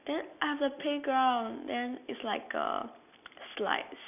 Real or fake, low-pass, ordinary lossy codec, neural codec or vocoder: real; 3.6 kHz; none; none